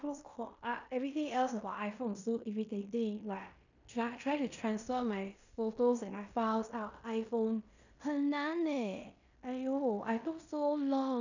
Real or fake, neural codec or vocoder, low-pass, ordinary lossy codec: fake; codec, 16 kHz in and 24 kHz out, 0.9 kbps, LongCat-Audio-Codec, fine tuned four codebook decoder; 7.2 kHz; none